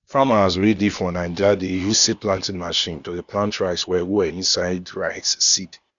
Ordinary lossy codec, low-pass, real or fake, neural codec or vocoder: none; 7.2 kHz; fake; codec, 16 kHz, 0.8 kbps, ZipCodec